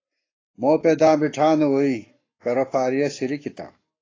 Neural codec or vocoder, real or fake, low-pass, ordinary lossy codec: codec, 44.1 kHz, 7.8 kbps, Pupu-Codec; fake; 7.2 kHz; AAC, 32 kbps